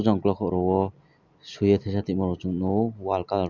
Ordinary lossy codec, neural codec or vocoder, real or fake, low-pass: none; none; real; 7.2 kHz